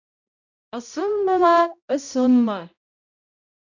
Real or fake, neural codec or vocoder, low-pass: fake; codec, 16 kHz, 0.5 kbps, X-Codec, HuBERT features, trained on balanced general audio; 7.2 kHz